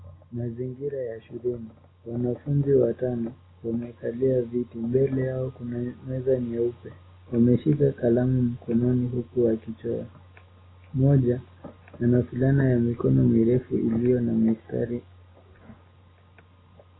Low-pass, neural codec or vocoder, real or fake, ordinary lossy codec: 7.2 kHz; none; real; AAC, 16 kbps